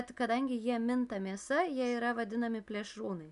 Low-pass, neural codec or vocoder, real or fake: 10.8 kHz; none; real